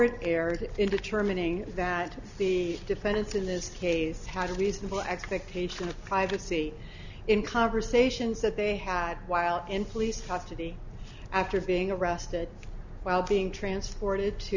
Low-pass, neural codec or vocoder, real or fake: 7.2 kHz; none; real